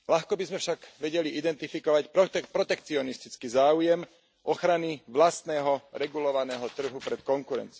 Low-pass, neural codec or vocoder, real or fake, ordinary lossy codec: none; none; real; none